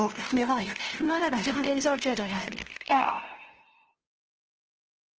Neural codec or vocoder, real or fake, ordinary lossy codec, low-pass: codec, 16 kHz, 0.5 kbps, FunCodec, trained on LibriTTS, 25 frames a second; fake; Opus, 16 kbps; 7.2 kHz